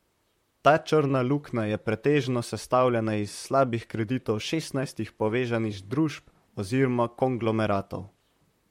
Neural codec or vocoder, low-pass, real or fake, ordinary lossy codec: vocoder, 44.1 kHz, 128 mel bands, Pupu-Vocoder; 19.8 kHz; fake; MP3, 64 kbps